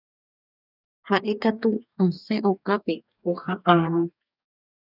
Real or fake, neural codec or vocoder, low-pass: fake; codec, 44.1 kHz, 2.6 kbps, DAC; 5.4 kHz